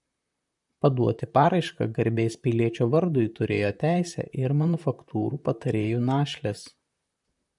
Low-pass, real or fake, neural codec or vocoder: 10.8 kHz; fake; vocoder, 44.1 kHz, 128 mel bands, Pupu-Vocoder